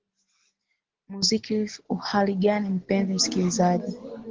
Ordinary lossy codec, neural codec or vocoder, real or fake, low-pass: Opus, 16 kbps; none; real; 7.2 kHz